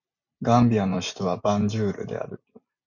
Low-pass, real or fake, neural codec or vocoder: 7.2 kHz; real; none